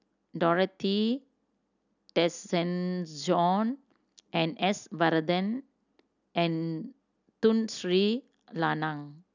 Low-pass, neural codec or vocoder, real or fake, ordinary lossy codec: 7.2 kHz; none; real; none